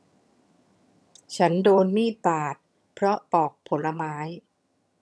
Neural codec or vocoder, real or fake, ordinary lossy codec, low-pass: vocoder, 22.05 kHz, 80 mel bands, HiFi-GAN; fake; none; none